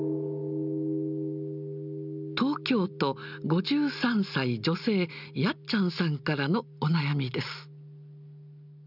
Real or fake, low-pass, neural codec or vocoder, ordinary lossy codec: real; 5.4 kHz; none; none